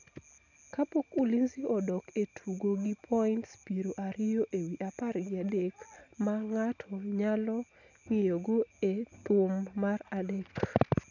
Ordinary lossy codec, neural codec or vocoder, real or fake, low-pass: none; none; real; 7.2 kHz